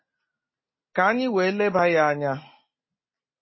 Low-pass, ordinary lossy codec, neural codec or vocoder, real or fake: 7.2 kHz; MP3, 24 kbps; none; real